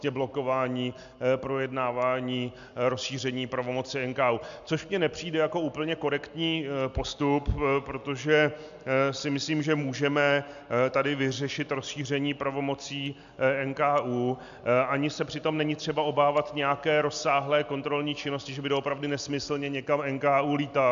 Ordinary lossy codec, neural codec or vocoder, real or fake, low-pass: AAC, 96 kbps; none; real; 7.2 kHz